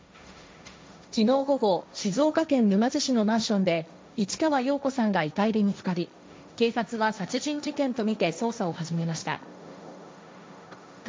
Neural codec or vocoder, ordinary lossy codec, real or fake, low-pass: codec, 16 kHz, 1.1 kbps, Voila-Tokenizer; none; fake; none